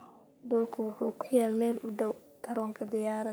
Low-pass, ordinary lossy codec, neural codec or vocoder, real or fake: none; none; codec, 44.1 kHz, 3.4 kbps, Pupu-Codec; fake